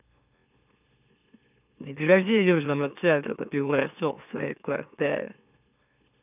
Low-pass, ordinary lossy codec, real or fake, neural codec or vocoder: 3.6 kHz; none; fake; autoencoder, 44.1 kHz, a latent of 192 numbers a frame, MeloTTS